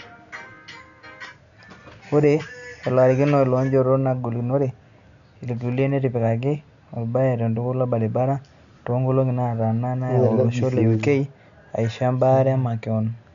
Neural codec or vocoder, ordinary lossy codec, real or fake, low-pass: none; none; real; 7.2 kHz